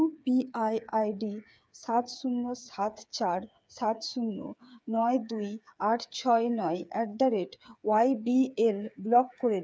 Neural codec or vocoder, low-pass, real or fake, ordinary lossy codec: codec, 16 kHz, 8 kbps, FreqCodec, smaller model; none; fake; none